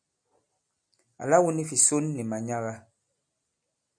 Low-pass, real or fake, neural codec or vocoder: 9.9 kHz; real; none